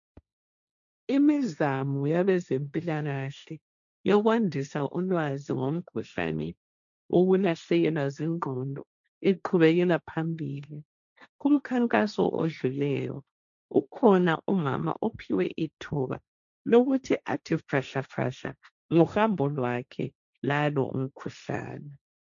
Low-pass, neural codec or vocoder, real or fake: 7.2 kHz; codec, 16 kHz, 1.1 kbps, Voila-Tokenizer; fake